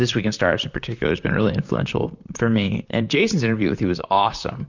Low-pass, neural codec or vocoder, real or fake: 7.2 kHz; vocoder, 44.1 kHz, 128 mel bands, Pupu-Vocoder; fake